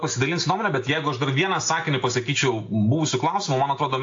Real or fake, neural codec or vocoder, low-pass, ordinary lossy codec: real; none; 7.2 kHz; AAC, 48 kbps